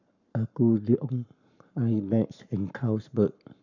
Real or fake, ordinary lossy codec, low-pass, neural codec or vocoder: fake; none; 7.2 kHz; codec, 44.1 kHz, 7.8 kbps, Pupu-Codec